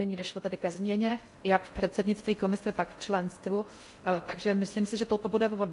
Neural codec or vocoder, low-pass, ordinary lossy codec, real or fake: codec, 16 kHz in and 24 kHz out, 0.6 kbps, FocalCodec, streaming, 4096 codes; 10.8 kHz; AAC, 48 kbps; fake